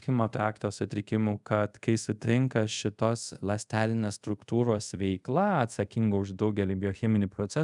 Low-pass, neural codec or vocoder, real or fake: 10.8 kHz; codec, 24 kHz, 0.5 kbps, DualCodec; fake